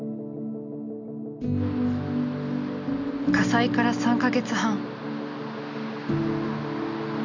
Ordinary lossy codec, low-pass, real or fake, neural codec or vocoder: none; 7.2 kHz; real; none